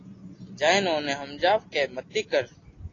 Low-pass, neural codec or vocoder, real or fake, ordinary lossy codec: 7.2 kHz; none; real; AAC, 32 kbps